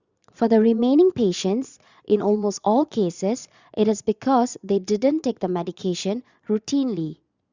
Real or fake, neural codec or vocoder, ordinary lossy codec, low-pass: fake; vocoder, 22.05 kHz, 80 mel bands, Vocos; Opus, 64 kbps; 7.2 kHz